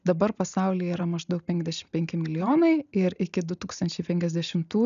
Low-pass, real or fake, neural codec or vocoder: 7.2 kHz; real; none